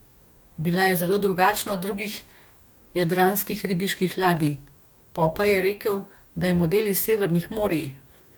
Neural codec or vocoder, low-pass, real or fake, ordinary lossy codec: codec, 44.1 kHz, 2.6 kbps, DAC; none; fake; none